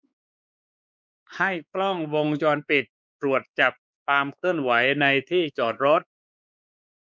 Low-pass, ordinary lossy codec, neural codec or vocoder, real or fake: none; none; codec, 16 kHz, 4 kbps, X-Codec, WavLM features, trained on Multilingual LibriSpeech; fake